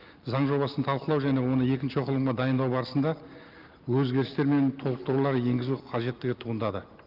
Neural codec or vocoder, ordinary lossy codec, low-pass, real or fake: none; Opus, 32 kbps; 5.4 kHz; real